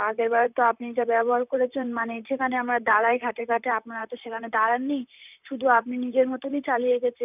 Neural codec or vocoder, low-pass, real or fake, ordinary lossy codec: vocoder, 44.1 kHz, 128 mel bands, Pupu-Vocoder; 3.6 kHz; fake; none